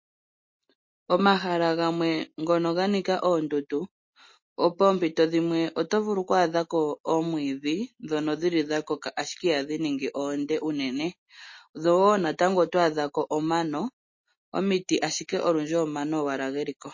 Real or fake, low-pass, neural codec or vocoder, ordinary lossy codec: real; 7.2 kHz; none; MP3, 32 kbps